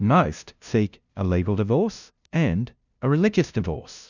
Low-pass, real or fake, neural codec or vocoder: 7.2 kHz; fake; codec, 16 kHz, 0.5 kbps, FunCodec, trained on LibriTTS, 25 frames a second